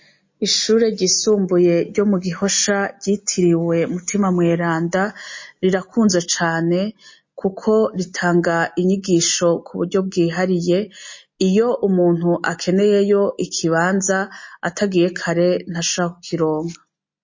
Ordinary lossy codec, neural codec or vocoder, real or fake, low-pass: MP3, 32 kbps; none; real; 7.2 kHz